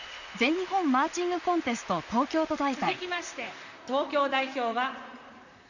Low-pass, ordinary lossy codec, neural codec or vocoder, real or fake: 7.2 kHz; none; vocoder, 44.1 kHz, 128 mel bands, Pupu-Vocoder; fake